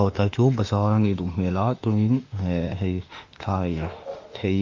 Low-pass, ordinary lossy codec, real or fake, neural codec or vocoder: 7.2 kHz; Opus, 24 kbps; fake; autoencoder, 48 kHz, 32 numbers a frame, DAC-VAE, trained on Japanese speech